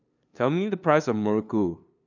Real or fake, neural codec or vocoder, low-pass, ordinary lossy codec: fake; codec, 16 kHz, 2 kbps, FunCodec, trained on LibriTTS, 25 frames a second; 7.2 kHz; none